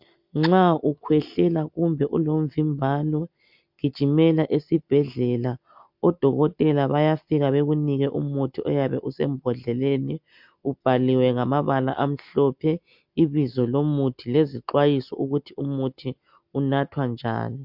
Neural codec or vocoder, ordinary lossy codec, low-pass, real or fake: none; MP3, 48 kbps; 5.4 kHz; real